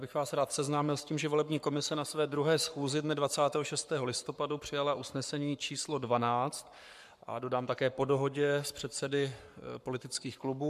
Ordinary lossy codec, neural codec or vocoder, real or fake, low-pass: MP3, 96 kbps; codec, 44.1 kHz, 7.8 kbps, Pupu-Codec; fake; 14.4 kHz